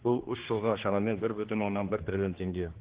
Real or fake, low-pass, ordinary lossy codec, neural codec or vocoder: fake; 3.6 kHz; Opus, 16 kbps; codec, 16 kHz, 2 kbps, X-Codec, HuBERT features, trained on general audio